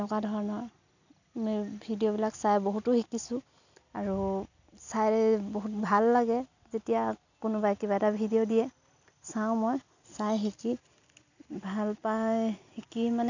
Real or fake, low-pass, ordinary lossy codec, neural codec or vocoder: real; 7.2 kHz; none; none